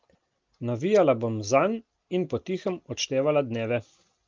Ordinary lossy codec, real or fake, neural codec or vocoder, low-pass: Opus, 32 kbps; real; none; 7.2 kHz